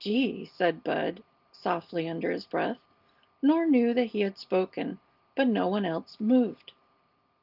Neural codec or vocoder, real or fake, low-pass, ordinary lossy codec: none; real; 5.4 kHz; Opus, 32 kbps